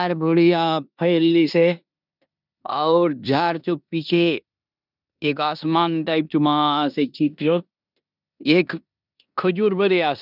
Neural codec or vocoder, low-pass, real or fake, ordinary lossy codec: codec, 16 kHz in and 24 kHz out, 0.9 kbps, LongCat-Audio-Codec, four codebook decoder; 5.4 kHz; fake; none